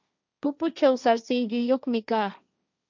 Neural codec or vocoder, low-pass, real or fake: codec, 16 kHz, 1.1 kbps, Voila-Tokenizer; 7.2 kHz; fake